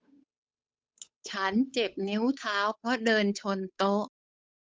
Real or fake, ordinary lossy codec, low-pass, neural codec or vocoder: fake; none; none; codec, 16 kHz, 8 kbps, FunCodec, trained on Chinese and English, 25 frames a second